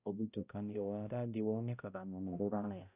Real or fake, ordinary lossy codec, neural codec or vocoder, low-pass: fake; none; codec, 16 kHz, 0.5 kbps, X-Codec, HuBERT features, trained on balanced general audio; 3.6 kHz